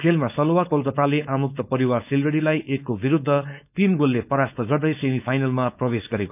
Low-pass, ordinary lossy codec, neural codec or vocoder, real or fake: 3.6 kHz; none; codec, 16 kHz, 4.8 kbps, FACodec; fake